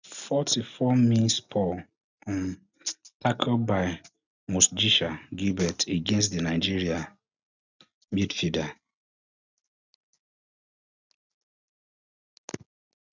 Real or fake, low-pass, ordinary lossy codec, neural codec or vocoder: real; 7.2 kHz; none; none